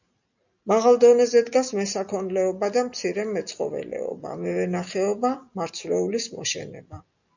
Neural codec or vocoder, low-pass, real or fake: none; 7.2 kHz; real